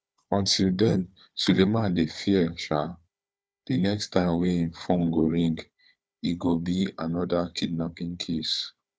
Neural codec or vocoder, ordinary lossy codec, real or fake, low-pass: codec, 16 kHz, 4 kbps, FunCodec, trained on Chinese and English, 50 frames a second; none; fake; none